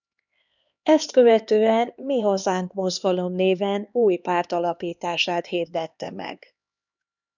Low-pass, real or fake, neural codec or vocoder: 7.2 kHz; fake; codec, 16 kHz, 2 kbps, X-Codec, HuBERT features, trained on LibriSpeech